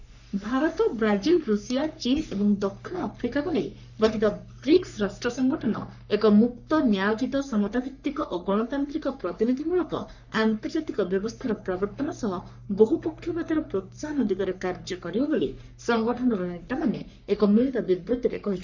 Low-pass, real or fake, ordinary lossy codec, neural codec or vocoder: 7.2 kHz; fake; none; codec, 44.1 kHz, 3.4 kbps, Pupu-Codec